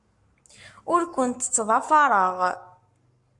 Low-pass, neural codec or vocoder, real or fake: 10.8 kHz; codec, 44.1 kHz, 7.8 kbps, Pupu-Codec; fake